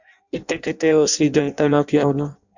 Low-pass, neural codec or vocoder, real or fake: 7.2 kHz; codec, 16 kHz in and 24 kHz out, 0.6 kbps, FireRedTTS-2 codec; fake